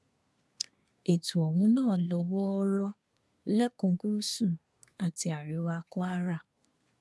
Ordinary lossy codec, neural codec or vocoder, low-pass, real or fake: none; codec, 24 kHz, 1 kbps, SNAC; none; fake